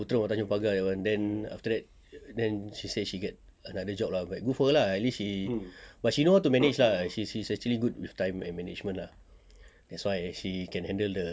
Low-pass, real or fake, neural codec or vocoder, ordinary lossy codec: none; real; none; none